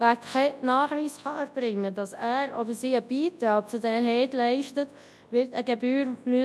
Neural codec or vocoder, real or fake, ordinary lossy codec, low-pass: codec, 24 kHz, 0.9 kbps, WavTokenizer, large speech release; fake; none; none